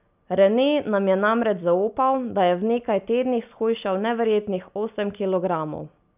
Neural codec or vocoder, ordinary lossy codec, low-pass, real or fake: none; none; 3.6 kHz; real